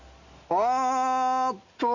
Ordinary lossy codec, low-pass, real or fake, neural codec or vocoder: none; 7.2 kHz; real; none